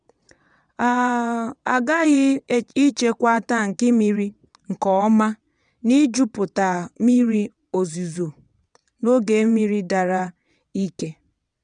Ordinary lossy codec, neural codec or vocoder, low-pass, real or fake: none; vocoder, 22.05 kHz, 80 mel bands, WaveNeXt; 9.9 kHz; fake